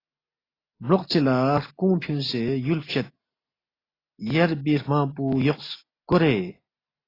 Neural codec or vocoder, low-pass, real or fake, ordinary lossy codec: none; 5.4 kHz; real; AAC, 24 kbps